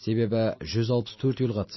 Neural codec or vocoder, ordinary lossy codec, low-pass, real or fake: none; MP3, 24 kbps; 7.2 kHz; real